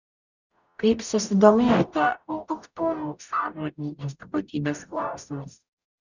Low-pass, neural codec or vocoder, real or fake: 7.2 kHz; codec, 44.1 kHz, 0.9 kbps, DAC; fake